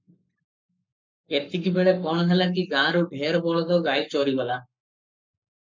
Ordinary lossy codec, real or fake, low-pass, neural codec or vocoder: MP3, 64 kbps; fake; 7.2 kHz; codec, 44.1 kHz, 7.8 kbps, Pupu-Codec